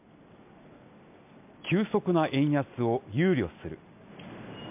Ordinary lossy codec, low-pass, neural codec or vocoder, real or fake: MP3, 32 kbps; 3.6 kHz; none; real